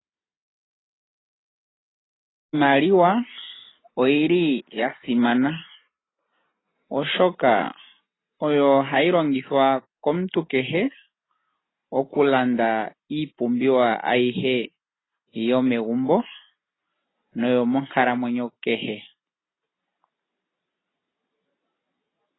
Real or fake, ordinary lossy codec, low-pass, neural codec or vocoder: real; AAC, 16 kbps; 7.2 kHz; none